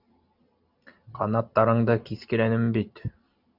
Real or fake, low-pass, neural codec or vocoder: real; 5.4 kHz; none